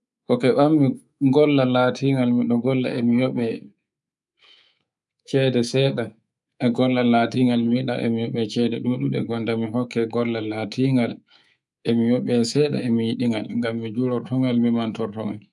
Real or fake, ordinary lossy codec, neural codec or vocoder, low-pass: fake; none; codec, 24 kHz, 3.1 kbps, DualCodec; 10.8 kHz